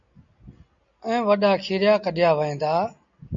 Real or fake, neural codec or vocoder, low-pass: real; none; 7.2 kHz